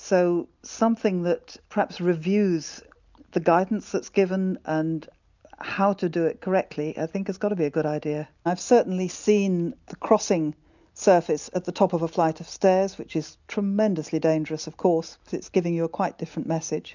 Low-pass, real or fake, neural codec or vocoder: 7.2 kHz; real; none